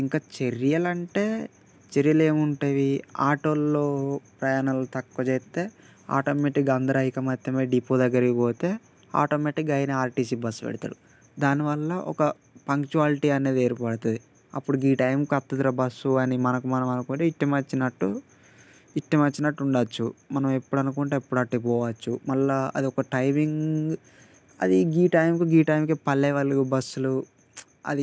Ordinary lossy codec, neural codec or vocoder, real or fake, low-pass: none; none; real; none